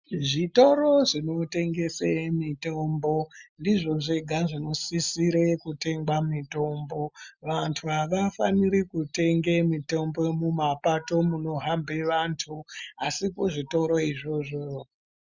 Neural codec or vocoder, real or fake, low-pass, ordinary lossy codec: none; real; 7.2 kHz; Opus, 64 kbps